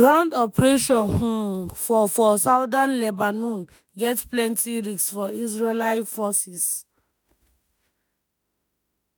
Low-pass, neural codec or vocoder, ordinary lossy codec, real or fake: none; autoencoder, 48 kHz, 32 numbers a frame, DAC-VAE, trained on Japanese speech; none; fake